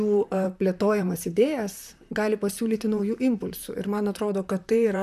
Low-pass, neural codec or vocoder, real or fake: 14.4 kHz; vocoder, 44.1 kHz, 128 mel bands, Pupu-Vocoder; fake